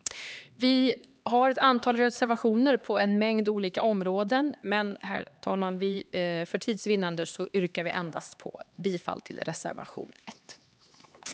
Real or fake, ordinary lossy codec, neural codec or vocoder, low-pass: fake; none; codec, 16 kHz, 2 kbps, X-Codec, HuBERT features, trained on LibriSpeech; none